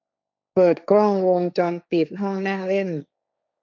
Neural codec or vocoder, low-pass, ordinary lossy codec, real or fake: codec, 16 kHz, 1.1 kbps, Voila-Tokenizer; 7.2 kHz; none; fake